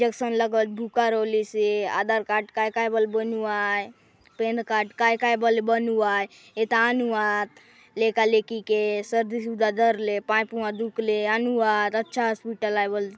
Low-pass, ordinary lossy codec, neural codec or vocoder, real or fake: none; none; none; real